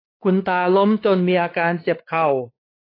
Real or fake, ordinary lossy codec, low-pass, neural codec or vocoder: fake; AAC, 24 kbps; 5.4 kHz; codec, 16 kHz, 4 kbps, X-Codec, WavLM features, trained on Multilingual LibriSpeech